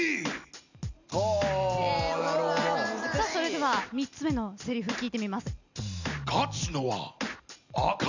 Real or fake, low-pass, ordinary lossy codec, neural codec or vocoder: real; 7.2 kHz; none; none